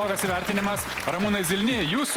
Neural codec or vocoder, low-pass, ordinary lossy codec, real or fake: vocoder, 48 kHz, 128 mel bands, Vocos; 19.8 kHz; Opus, 24 kbps; fake